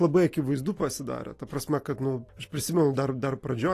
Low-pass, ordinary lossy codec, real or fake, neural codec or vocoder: 14.4 kHz; AAC, 48 kbps; real; none